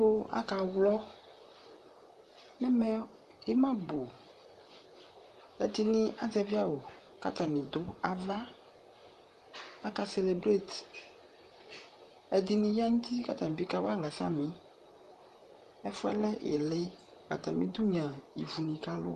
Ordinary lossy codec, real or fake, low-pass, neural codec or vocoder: Opus, 32 kbps; fake; 14.4 kHz; vocoder, 44.1 kHz, 128 mel bands, Pupu-Vocoder